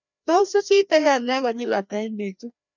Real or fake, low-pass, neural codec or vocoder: fake; 7.2 kHz; codec, 16 kHz, 1 kbps, FreqCodec, larger model